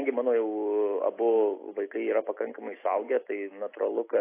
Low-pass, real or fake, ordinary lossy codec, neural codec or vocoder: 3.6 kHz; real; AAC, 24 kbps; none